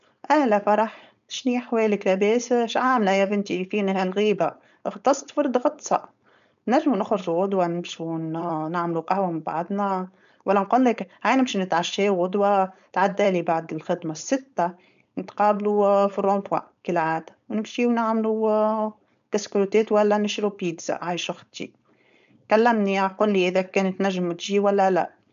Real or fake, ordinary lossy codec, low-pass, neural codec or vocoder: fake; none; 7.2 kHz; codec, 16 kHz, 4.8 kbps, FACodec